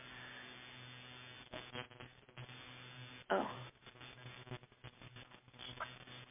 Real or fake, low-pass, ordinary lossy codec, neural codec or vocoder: real; 3.6 kHz; MP3, 32 kbps; none